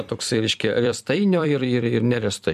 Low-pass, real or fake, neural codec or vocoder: 14.4 kHz; fake; vocoder, 44.1 kHz, 128 mel bands every 256 samples, BigVGAN v2